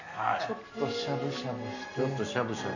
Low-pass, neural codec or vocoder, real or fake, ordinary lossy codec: 7.2 kHz; none; real; none